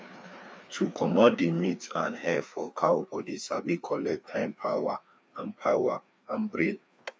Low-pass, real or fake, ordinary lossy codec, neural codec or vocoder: none; fake; none; codec, 16 kHz, 2 kbps, FreqCodec, larger model